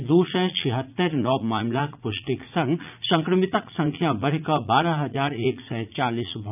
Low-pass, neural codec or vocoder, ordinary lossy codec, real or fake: 3.6 kHz; vocoder, 44.1 kHz, 80 mel bands, Vocos; none; fake